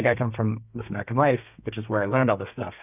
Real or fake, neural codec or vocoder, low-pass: fake; codec, 32 kHz, 1.9 kbps, SNAC; 3.6 kHz